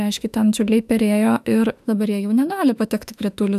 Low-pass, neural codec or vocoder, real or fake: 14.4 kHz; autoencoder, 48 kHz, 32 numbers a frame, DAC-VAE, trained on Japanese speech; fake